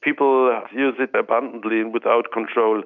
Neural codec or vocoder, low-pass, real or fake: none; 7.2 kHz; real